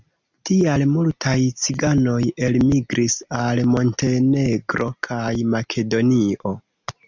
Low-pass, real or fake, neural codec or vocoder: 7.2 kHz; real; none